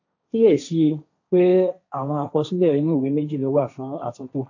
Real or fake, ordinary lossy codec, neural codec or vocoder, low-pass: fake; AAC, 48 kbps; codec, 16 kHz, 1.1 kbps, Voila-Tokenizer; 7.2 kHz